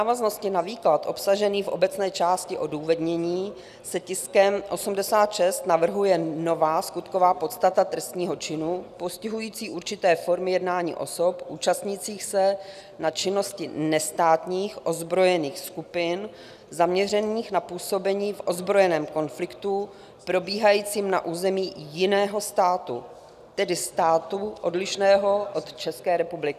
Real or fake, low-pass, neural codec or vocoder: real; 14.4 kHz; none